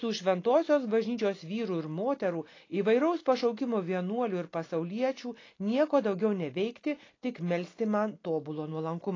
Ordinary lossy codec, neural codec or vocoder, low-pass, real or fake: AAC, 32 kbps; none; 7.2 kHz; real